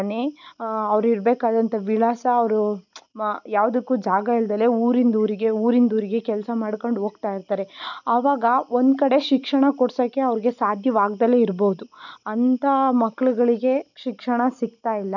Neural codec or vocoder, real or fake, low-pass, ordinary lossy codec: none; real; 7.2 kHz; none